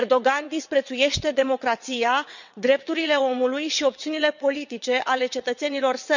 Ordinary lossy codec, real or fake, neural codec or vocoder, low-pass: none; fake; vocoder, 22.05 kHz, 80 mel bands, WaveNeXt; 7.2 kHz